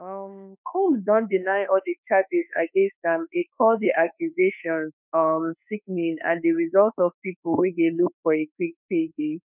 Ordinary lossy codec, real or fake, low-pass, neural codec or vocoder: none; fake; 3.6 kHz; autoencoder, 48 kHz, 32 numbers a frame, DAC-VAE, trained on Japanese speech